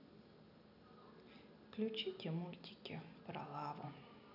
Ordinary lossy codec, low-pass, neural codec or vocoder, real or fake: none; 5.4 kHz; none; real